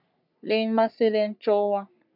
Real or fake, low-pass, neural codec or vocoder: fake; 5.4 kHz; codec, 44.1 kHz, 3.4 kbps, Pupu-Codec